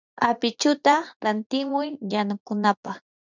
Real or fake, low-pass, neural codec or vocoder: fake; 7.2 kHz; vocoder, 44.1 kHz, 80 mel bands, Vocos